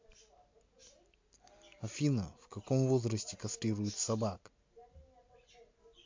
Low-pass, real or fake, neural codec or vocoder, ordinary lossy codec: 7.2 kHz; real; none; MP3, 48 kbps